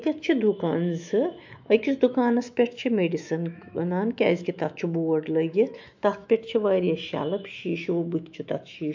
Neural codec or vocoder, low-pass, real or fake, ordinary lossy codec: autoencoder, 48 kHz, 128 numbers a frame, DAC-VAE, trained on Japanese speech; 7.2 kHz; fake; MP3, 64 kbps